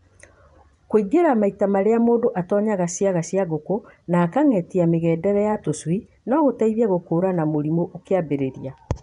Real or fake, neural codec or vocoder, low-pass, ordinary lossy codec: fake; vocoder, 24 kHz, 100 mel bands, Vocos; 10.8 kHz; none